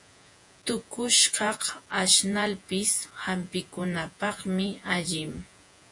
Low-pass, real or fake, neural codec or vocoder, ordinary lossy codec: 10.8 kHz; fake; vocoder, 48 kHz, 128 mel bands, Vocos; AAC, 64 kbps